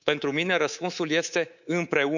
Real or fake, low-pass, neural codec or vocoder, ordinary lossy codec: fake; 7.2 kHz; codec, 24 kHz, 3.1 kbps, DualCodec; none